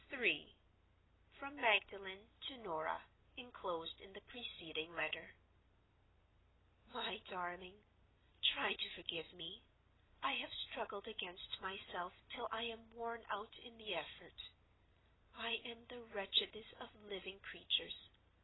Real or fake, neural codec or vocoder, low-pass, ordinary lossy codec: real; none; 7.2 kHz; AAC, 16 kbps